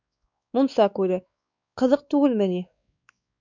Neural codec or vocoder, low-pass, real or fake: codec, 16 kHz, 4 kbps, X-Codec, WavLM features, trained on Multilingual LibriSpeech; 7.2 kHz; fake